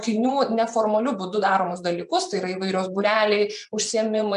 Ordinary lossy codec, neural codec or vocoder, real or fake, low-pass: MP3, 96 kbps; vocoder, 24 kHz, 100 mel bands, Vocos; fake; 10.8 kHz